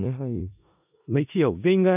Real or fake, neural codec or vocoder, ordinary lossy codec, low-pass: fake; codec, 16 kHz in and 24 kHz out, 0.4 kbps, LongCat-Audio-Codec, four codebook decoder; none; 3.6 kHz